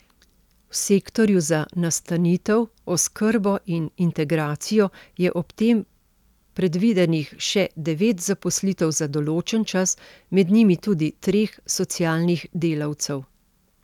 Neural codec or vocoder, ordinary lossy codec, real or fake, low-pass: none; none; real; 19.8 kHz